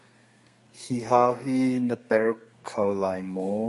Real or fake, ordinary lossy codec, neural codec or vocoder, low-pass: fake; MP3, 48 kbps; codec, 32 kHz, 1.9 kbps, SNAC; 14.4 kHz